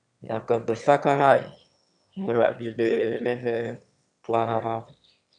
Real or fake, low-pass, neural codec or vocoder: fake; 9.9 kHz; autoencoder, 22.05 kHz, a latent of 192 numbers a frame, VITS, trained on one speaker